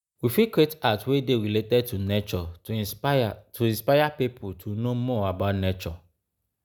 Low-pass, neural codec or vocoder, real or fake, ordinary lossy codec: none; none; real; none